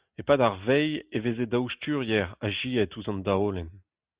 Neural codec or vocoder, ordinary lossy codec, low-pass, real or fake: none; Opus, 64 kbps; 3.6 kHz; real